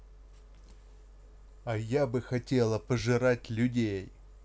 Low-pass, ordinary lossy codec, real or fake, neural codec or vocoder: none; none; real; none